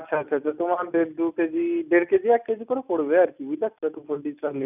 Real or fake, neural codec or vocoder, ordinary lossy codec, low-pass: real; none; none; 3.6 kHz